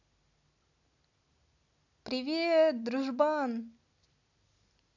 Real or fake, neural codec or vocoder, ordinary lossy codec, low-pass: real; none; none; 7.2 kHz